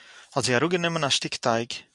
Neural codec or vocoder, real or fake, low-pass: vocoder, 44.1 kHz, 128 mel bands every 256 samples, BigVGAN v2; fake; 10.8 kHz